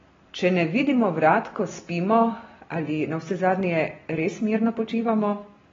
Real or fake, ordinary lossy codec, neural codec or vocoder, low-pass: real; AAC, 24 kbps; none; 7.2 kHz